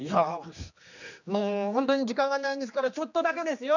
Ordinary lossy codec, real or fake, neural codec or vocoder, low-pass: none; fake; codec, 16 kHz, 2 kbps, X-Codec, HuBERT features, trained on general audio; 7.2 kHz